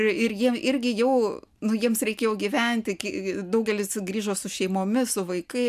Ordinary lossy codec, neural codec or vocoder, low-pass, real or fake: MP3, 96 kbps; none; 14.4 kHz; real